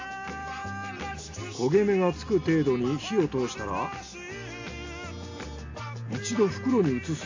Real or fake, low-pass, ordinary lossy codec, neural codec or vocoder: real; 7.2 kHz; AAC, 48 kbps; none